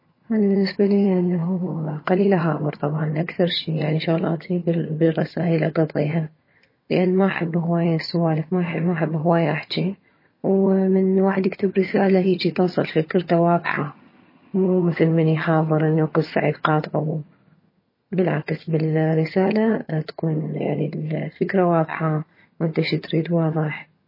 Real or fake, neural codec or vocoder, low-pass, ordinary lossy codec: fake; vocoder, 22.05 kHz, 80 mel bands, HiFi-GAN; 5.4 kHz; MP3, 24 kbps